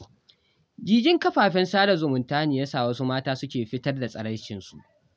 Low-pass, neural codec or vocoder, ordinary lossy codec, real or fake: none; none; none; real